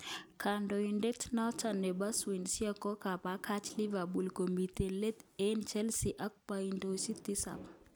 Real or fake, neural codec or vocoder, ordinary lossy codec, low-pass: real; none; none; none